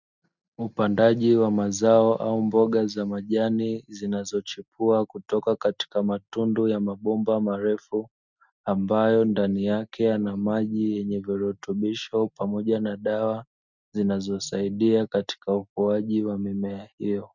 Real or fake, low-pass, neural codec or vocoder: real; 7.2 kHz; none